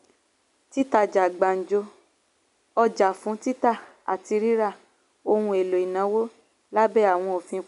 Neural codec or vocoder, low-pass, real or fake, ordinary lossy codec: none; 10.8 kHz; real; none